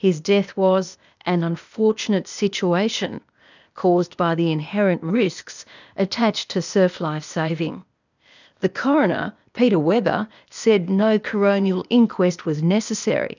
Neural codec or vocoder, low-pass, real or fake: codec, 16 kHz, 0.8 kbps, ZipCodec; 7.2 kHz; fake